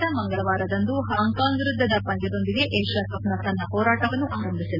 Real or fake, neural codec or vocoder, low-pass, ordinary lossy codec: real; none; 5.4 kHz; none